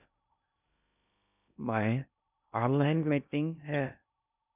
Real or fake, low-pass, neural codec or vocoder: fake; 3.6 kHz; codec, 16 kHz in and 24 kHz out, 0.6 kbps, FocalCodec, streaming, 2048 codes